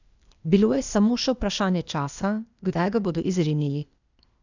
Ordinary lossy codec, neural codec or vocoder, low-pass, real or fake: none; codec, 16 kHz, 0.8 kbps, ZipCodec; 7.2 kHz; fake